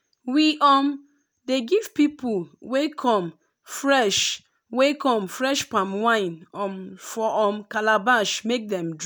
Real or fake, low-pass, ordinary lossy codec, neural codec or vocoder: real; none; none; none